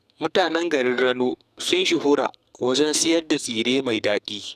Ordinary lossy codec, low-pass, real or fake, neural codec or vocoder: none; 14.4 kHz; fake; codec, 44.1 kHz, 2.6 kbps, SNAC